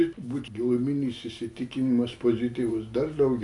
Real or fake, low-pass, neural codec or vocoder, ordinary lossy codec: real; 10.8 kHz; none; AAC, 64 kbps